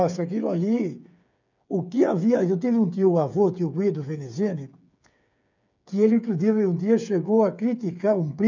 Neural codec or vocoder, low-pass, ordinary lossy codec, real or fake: codec, 16 kHz, 8 kbps, FreqCodec, smaller model; 7.2 kHz; none; fake